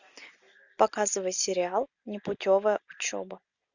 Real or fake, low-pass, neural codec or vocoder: real; 7.2 kHz; none